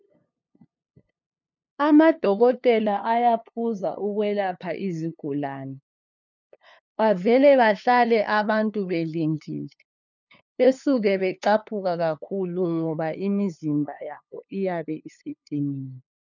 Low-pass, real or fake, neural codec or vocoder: 7.2 kHz; fake; codec, 16 kHz, 2 kbps, FunCodec, trained on LibriTTS, 25 frames a second